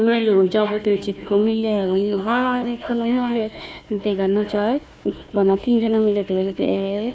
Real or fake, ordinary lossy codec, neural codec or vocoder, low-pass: fake; none; codec, 16 kHz, 1 kbps, FunCodec, trained on Chinese and English, 50 frames a second; none